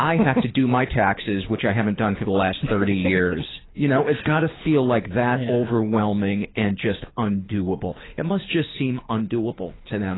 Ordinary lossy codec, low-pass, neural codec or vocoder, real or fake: AAC, 16 kbps; 7.2 kHz; codec, 24 kHz, 6 kbps, HILCodec; fake